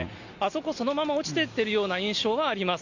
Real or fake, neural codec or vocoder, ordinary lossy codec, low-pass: real; none; none; 7.2 kHz